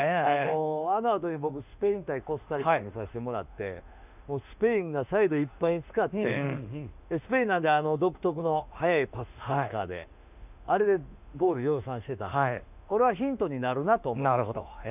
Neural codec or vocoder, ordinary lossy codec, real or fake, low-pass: autoencoder, 48 kHz, 32 numbers a frame, DAC-VAE, trained on Japanese speech; none; fake; 3.6 kHz